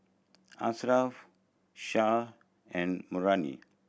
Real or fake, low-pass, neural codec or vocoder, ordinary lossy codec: real; none; none; none